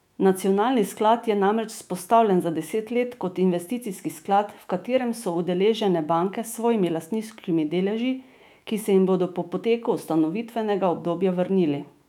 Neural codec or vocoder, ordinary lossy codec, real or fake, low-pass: autoencoder, 48 kHz, 128 numbers a frame, DAC-VAE, trained on Japanese speech; none; fake; 19.8 kHz